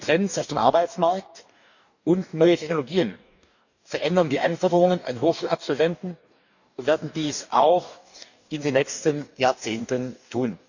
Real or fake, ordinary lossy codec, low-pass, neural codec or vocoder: fake; none; 7.2 kHz; codec, 44.1 kHz, 2.6 kbps, DAC